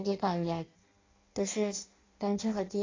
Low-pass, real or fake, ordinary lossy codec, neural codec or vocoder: 7.2 kHz; fake; AAC, 32 kbps; codec, 16 kHz in and 24 kHz out, 0.6 kbps, FireRedTTS-2 codec